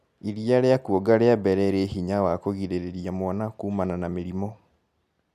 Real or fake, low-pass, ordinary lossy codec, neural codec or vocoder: real; 14.4 kHz; none; none